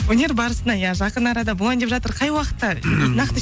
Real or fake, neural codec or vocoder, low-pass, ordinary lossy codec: real; none; none; none